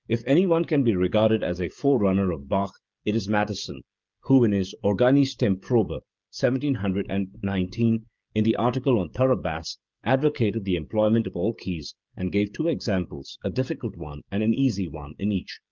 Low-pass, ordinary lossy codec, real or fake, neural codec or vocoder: 7.2 kHz; Opus, 24 kbps; fake; codec, 16 kHz, 16 kbps, FreqCodec, smaller model